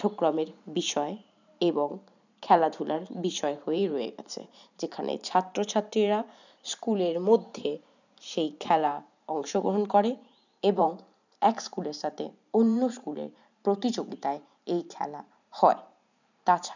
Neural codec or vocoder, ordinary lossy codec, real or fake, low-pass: vocoder, 44.1 kHz, 128 mel bands every 512 samples, BigVGAN v2; none; fake; 7.2 kHz